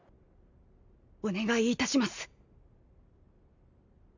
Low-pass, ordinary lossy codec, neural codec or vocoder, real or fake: 7.2 kHz; none; none; real